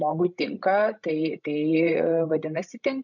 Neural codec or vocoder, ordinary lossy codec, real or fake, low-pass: codec, 16 kHz, 16 kbps, FreqCodec, larger model; MP3, 64 kbps; fake; 7.2 kHz